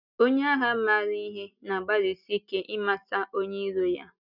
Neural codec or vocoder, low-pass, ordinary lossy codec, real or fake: none; 5.4 kHz; none; real